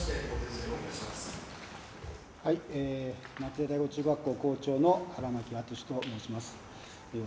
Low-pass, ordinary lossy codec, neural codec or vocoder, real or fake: none; none; none; real